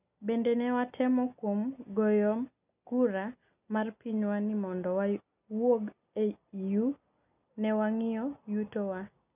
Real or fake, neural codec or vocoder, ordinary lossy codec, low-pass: real; none; none; 3.6 kHz